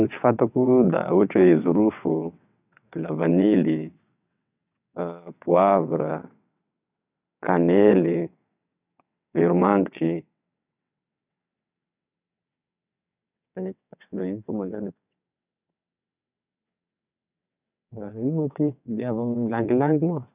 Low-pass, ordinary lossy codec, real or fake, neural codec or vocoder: 3.6 kHz; none; fake; vocoder, 22.05 kHz, 80 mel bands, WaveNeXt